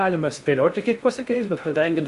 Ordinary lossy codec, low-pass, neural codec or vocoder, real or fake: Opus, 64 kbps; 10.8 kHz; codec, 16 kHz in and 24 kHz out, 0.8 kbps, FocalCodec, streaming, 65536 codes; fake